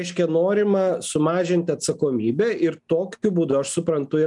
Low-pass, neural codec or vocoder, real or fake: 10.8 kHz; none; real